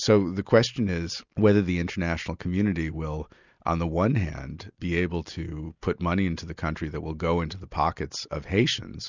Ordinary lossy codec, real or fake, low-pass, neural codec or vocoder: Opus, 64 kbps; real; 7.2 kHz; none